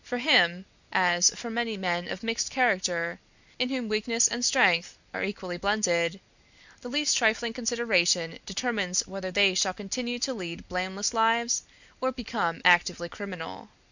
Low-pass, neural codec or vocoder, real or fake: 7.2 kHz; none; real